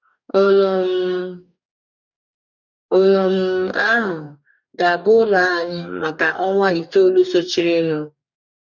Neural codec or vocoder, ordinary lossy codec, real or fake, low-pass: codec, 44.1 kHz, 2.6 kbps, DAC; none; fake; 7.2 kHz